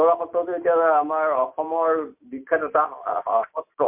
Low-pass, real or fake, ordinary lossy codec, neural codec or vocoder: 3.6 kHz; real; MP3, 32 kbps; none